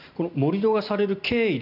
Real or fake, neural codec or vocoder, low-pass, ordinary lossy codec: real; none; 5.4 kHz; none